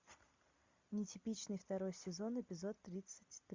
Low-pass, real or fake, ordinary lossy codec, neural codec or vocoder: 7.2 kHz; real; MP3, 64 kbps; none